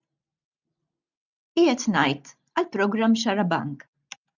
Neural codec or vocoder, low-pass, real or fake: none; 7.2 kHz; real